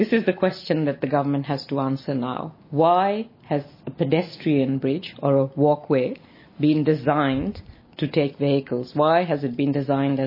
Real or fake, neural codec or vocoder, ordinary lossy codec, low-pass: real; none; MP3, 24 kbps; 5.4 kHz